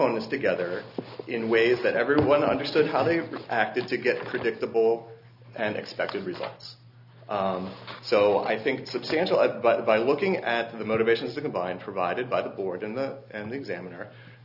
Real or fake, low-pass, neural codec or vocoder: real; 5.4 kHz; none